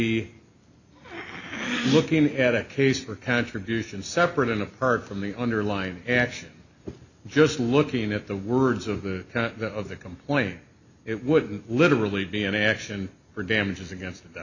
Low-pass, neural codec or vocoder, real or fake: 7.2 kHz; none; real